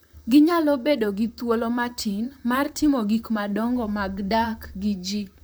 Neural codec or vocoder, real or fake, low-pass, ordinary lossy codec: vocoder, 44.1 kHz, 128 mel bands, Pupu-Vocoder; fake; none; none